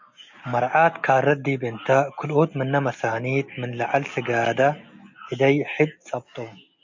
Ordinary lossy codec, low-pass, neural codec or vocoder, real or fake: MP3, 48 kbps; 7.2 kHz; none; real